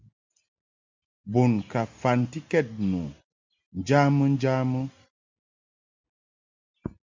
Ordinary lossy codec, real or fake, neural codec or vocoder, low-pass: MP3, 64 kbps; real; none; 7.2 kHz